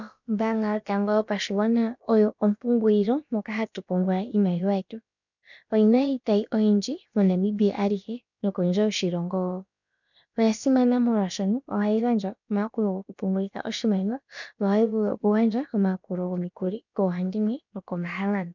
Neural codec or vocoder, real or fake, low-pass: codec, 16 kHz, about 1 kbps, DyCAST, with the encoder's durations; fake; 7.2 kHz